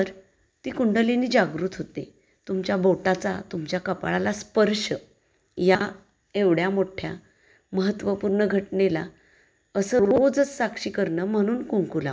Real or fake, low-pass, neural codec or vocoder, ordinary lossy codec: real; none; none; none